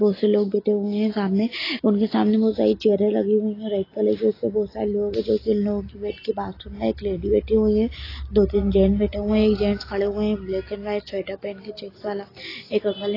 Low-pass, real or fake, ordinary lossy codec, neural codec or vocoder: 5.4 kHz; real; AAC, 24 kbps; none